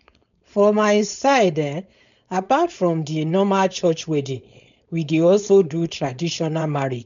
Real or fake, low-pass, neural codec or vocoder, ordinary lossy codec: fake; 7.2 kHz; codec, 16 kHz, 4.8 kbps, FACodec; none